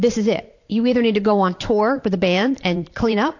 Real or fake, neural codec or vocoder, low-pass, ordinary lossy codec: fake; vocoder, 44.1 kHz, 128 mel bands every 512 samples, BigVGAN v2; 7.2 kHz; AAC, 48 kbps